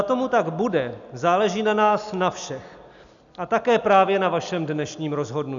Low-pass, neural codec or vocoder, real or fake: 7.2 kHz; none; real